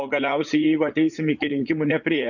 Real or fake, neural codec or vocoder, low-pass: fake; vocoder, 44.1 kHz, 80 mel bands, Vocos; 7.2 kHz